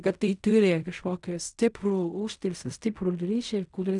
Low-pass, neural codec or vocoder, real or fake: 10.8 kHz; codec, 16 kHz in and 24 kHz out, 0.4 kbps, LongCat-Audio-Codec, fine tuned four codebook decoder; fake